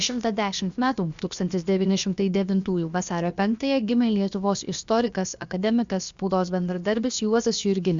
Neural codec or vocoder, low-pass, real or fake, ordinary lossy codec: codec, 16 kHz, about 1 kbps, DyCAST, with the encoder's durations; 7.2 kHz; fake; Opus, 64 kbps